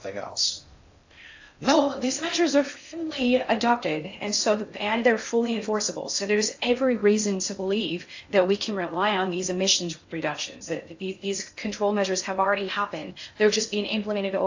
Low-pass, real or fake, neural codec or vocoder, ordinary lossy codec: 7.2 kHz; fake; codec, 16 kHz in and 24 kHz out, 0.6 kbps, FocalCodec, streaming, 2048 codes; AAC, 48 kbps